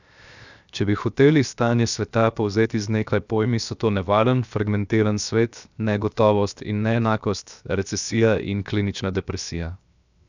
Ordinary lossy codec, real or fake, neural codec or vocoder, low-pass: none; fake; codec, 16 kHz, 0.7 kbps, FocalCodec; 7.2 kHz